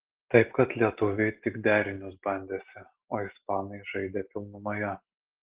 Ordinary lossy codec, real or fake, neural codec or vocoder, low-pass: Opus, 16 kbps; real; none; 3.6 kHz